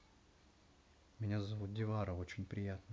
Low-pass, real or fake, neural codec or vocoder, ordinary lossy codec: none; real; none; none